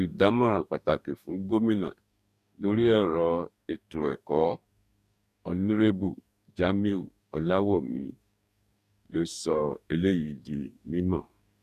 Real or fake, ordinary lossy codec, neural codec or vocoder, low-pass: fake; none; codec, 44.1 kHz, 2.6 kbps, DAC; 14.4 kHz